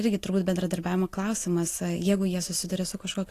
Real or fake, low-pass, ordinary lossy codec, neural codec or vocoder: real; 14.4 kHz; AAC, 48 kbps; none